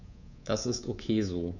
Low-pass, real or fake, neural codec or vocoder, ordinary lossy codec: 7.2 kHz; fake; codec, 24 kHz, 3.1 kbps, DualCodec; none